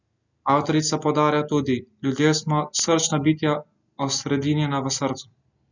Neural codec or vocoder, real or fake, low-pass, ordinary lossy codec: none; real; 7.2 kHz; none